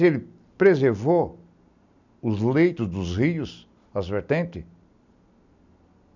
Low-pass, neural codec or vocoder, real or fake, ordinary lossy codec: 7.2 kHz; none; real; none